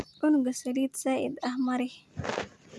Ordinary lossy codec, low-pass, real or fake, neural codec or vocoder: none; none; real; none